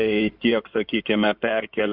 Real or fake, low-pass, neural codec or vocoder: fake; 5.4 kHz; codec, 16 kHz in and 24 kHz out, 2.2 kbps, FireRedTTS-2 codec